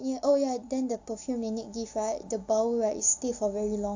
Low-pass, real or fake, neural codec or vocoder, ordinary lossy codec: 7.2 kHz; real; none; AAC, 48 kbps